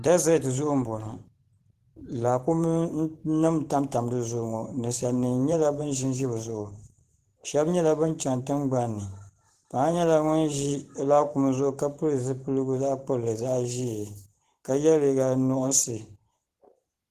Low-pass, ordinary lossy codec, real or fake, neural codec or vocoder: 14.4 kHz; Opus, 16 kbps; fake; vocoder, 44.1 kHz, 128 mel bands every 512 samples, BigVGAN v2